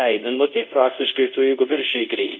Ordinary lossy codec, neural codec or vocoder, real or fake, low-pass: Opus, 64 kbps; codec, 24 kHz, 0.5 kbps, DualCodec; fake; 7.2 kHz